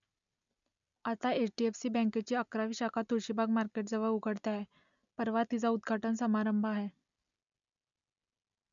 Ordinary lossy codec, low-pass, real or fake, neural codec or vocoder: none; 7.2 kHz; real; none